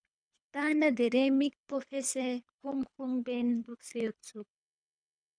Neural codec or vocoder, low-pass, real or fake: codec, 24 kHz, 3 kbps, HILCodec; 9.9 kHz; fake